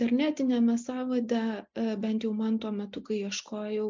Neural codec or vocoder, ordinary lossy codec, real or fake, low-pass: none; MP3, 64 kbps; real; 7.2 kHz